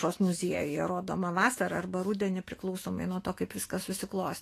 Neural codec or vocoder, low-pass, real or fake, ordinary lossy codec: autoencoder, 48 kHz, 128 numbers a frame, DAC-VAE, trained on Japanese speech; 14.4 kHz; fake; AAC, 48 kbps